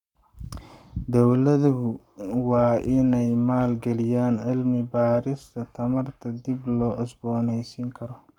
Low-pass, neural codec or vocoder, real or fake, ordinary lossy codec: 19.8 kHz; codec, 44.1 kHz, 7.8 kbps, Pupu-Codec; fake; none